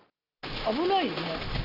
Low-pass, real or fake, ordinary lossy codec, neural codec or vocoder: 5.4 kHz; real; none; none